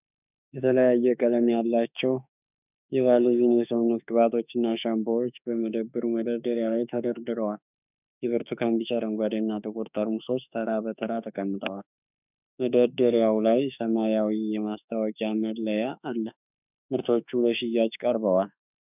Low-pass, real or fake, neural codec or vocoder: 3.6 kHz; fake; autoencoder, 48 kHz, 32 numbers a frame, DAC-VAE, trained on Japanese speech